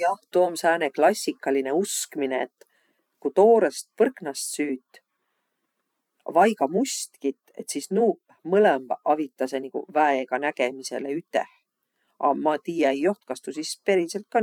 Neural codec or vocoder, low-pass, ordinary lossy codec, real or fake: vocoder, 44.1 kHz, 128 mel bands every 512 samples, BigVGAN v2; 19.8 kHz; none; fake